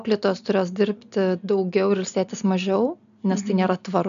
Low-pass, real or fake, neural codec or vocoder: 7.2 kHz; real; none